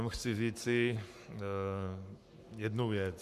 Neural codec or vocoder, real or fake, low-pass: codec, 44.1 kHz, 7.8 kbps, Pupu-Codec; fake; 14.4 kHz